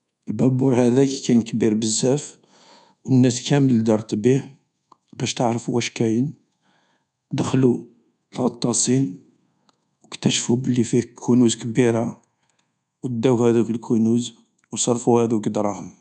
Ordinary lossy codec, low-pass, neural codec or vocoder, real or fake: none; 10.8 kHz; codec, 24 kHz, 1.2 kbps, DualCodec; fake